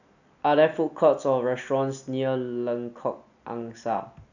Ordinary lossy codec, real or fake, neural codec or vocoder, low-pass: none; real; none; 7.2 kHz